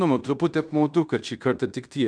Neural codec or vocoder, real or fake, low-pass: codec, 16 kHz in and 24 kHz out, 0.9 kbps, LongCat-Audio-Codec, fine tuned four codebook decoder; fake; 9.9 kHz